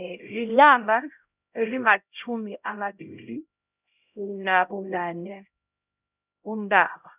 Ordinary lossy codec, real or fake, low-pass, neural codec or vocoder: none; fake; 3.6 kHz; codec, 16 kHz, 0.5 kbps, X-Codec, HuBERT features, trained on LibriSpeech